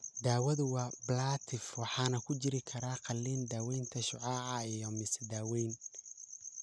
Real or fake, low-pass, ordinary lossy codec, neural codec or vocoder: real; none; none; none